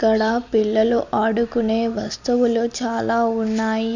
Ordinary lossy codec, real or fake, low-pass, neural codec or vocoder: none; real; 7.2 kHz; none